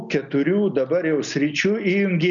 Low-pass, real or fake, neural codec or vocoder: 7.2 kHz; real; none